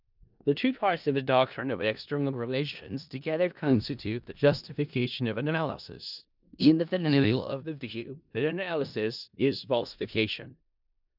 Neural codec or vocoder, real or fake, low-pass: codec, 16 kHz in and 24 kHz out, 0.4 kbps, LongCat-Audio-Codec, four codebook decoder; fake; 5.4 kHz